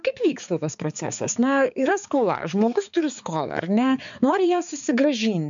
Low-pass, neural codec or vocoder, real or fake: 7.2 kHz; codec, 16 kHz, 4 kbps, X-Codec, HuBERT features, trained on balanced general audio; fake